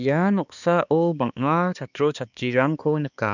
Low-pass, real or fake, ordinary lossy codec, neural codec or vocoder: 7.2 kHz; fake; none; codec, 16 kHz, 2 kbps, X-Codec, HuBERT features, trained on balanced general audio